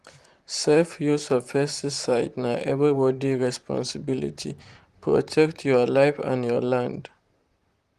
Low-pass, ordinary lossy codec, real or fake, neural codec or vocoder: 14.4 kHz; Opus, 24 kbps; fake; vocoder, 44.1 kHz, 128 mel bands, Pupu-Vocoder